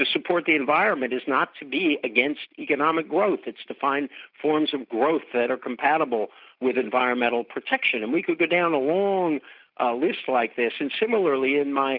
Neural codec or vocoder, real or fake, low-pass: none; real; 5.4 kHz